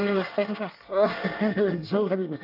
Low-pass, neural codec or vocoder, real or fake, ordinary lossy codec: 5.4 kHz; codec, 24 kHz, 1 kbps, SNAC; fake; none